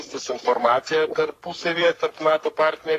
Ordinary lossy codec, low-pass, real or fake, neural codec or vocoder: AAC, 48 kbps; 14.4 kHz; fake; codec, 44.1 kHz, 3.4 kbps, Pupu-Codec